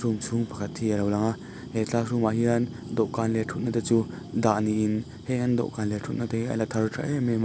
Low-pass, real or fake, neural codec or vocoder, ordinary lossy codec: none; real; none; none